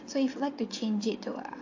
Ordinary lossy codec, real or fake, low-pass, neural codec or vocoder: AAC, 48 kbps; fake; 7.2 kHz; vocoder, 22.05 kHz, 80 mel bands, WaveNeXt